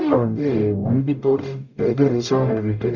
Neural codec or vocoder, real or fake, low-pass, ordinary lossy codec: codec, 44.1 kHz, 0.9 kbps, DAC; fake; 7.2 kHz; Opus, 64 kbps